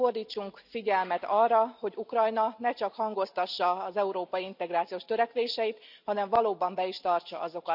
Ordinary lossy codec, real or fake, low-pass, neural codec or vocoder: none; real; 5.4 kHz; none